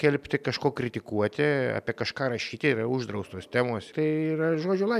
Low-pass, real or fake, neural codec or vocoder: 14.4 kHz; real; none